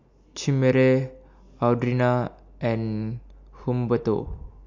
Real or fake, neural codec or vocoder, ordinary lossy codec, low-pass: real; none; MP3, 48 kbps; 7.2 kHz